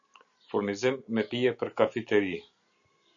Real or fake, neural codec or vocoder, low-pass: real; none; 7.2 kHz